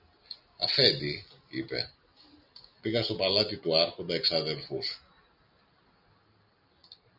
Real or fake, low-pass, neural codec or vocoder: real; 5.4 kHz; none